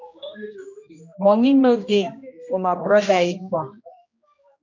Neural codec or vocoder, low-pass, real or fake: codec, 16 kHz, 1 kbps, X-Codec, HuBERT features, trained on general audio; 7.2 kHz; fake